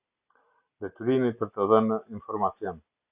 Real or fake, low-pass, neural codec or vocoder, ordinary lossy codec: real; 3.6 kHz; none; Opus, 64 kbps